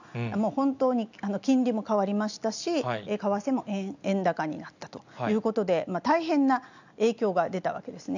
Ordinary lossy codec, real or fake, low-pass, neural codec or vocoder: none; real; 7.2 kHz; none